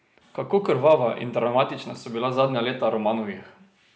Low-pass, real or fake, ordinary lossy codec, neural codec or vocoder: none; real; none; none